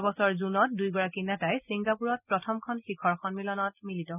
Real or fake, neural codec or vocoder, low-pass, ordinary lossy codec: real; none; 3.6 kHz; none